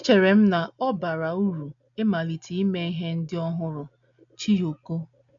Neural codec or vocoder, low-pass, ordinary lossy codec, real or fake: none; 7.2 kHz; none; real